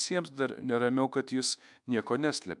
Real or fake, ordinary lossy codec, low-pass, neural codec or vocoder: fake; MP3, 96 kbps; 10.8 kHz; codec, 24 kHz, 1.2 kbps, DualCodec